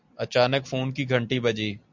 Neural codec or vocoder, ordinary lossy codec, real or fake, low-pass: none; MP3, 64 kbps; real; 7.2 kHz